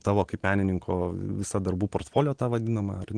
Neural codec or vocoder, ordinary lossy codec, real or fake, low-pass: none; Opus, 16 kbps; real; 9.9 kHz